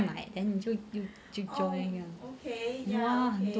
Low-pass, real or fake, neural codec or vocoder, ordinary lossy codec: none; real; none; none